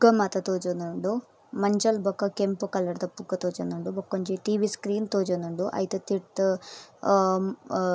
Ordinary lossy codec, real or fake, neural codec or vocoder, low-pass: none; real; none; none